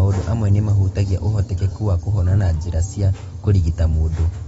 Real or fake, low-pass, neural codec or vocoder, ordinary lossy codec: real; 19.8 kHz; none; AAC, 24 kbps